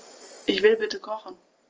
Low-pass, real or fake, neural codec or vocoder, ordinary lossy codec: 7.2 kHz; real; none; Opus, 24 kbps